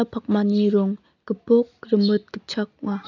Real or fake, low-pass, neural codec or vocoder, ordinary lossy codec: real; 7.2 kHz; none; none